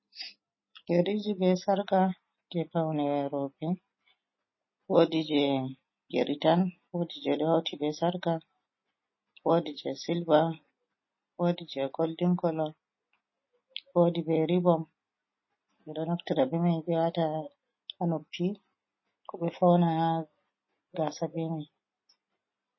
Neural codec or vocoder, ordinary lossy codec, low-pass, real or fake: none; MP3, 24 kbps; 7.2 kHz; real